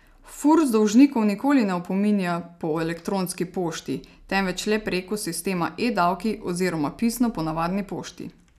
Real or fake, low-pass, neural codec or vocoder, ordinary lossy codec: real; 14.4 kHz; none; none